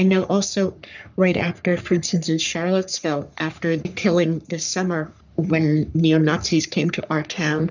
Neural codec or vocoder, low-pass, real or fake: codec, 44.1 kHz, 3.4 kbps, Pupu-Codec; 7.2 kHz; fake